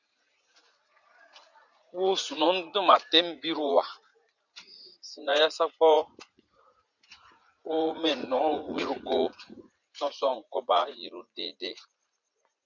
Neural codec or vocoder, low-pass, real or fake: vocoder, 44.1 kHz, 80 mel bands, Vocos; 7.2 kHz; fake